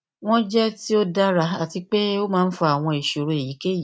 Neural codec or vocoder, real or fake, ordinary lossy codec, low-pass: none; real; none; none